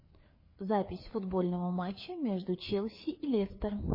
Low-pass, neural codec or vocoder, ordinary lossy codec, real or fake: 5.4 kHz; codec, 16 kHz, 4 kbps, FreqCodec, larger model; MP3, 24 kbps; fake